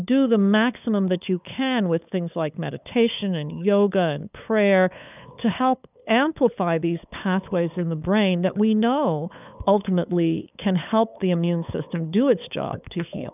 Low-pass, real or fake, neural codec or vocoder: 3.6 kHz; fake; codec, 16 kHz, 8 kbps, FunCodec, trained on LibriTTS, 25 frames a second